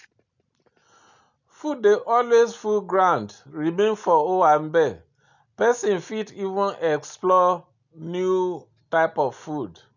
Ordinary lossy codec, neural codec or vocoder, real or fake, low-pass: none; none; real; 7.2 kHz